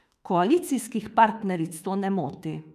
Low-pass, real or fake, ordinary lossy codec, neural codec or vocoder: 14.4 kHz; fake; none; autoencoder, 48 kHz, 32 numbers a frame, DAC-VAE, trained on Japanese speech